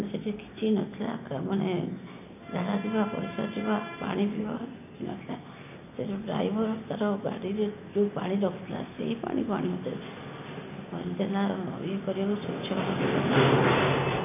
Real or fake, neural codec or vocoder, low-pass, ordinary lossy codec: real; none; 3.6 kHz; none